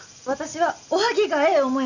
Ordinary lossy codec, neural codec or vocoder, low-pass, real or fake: none; none; 7.2 kHz; real